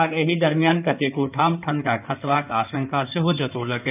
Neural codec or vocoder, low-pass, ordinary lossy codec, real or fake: codec, 16 kHz in and 24 kHz out, 2.2 kbps, FireRedTTS-2 codec; 3.6 kHz; none; fake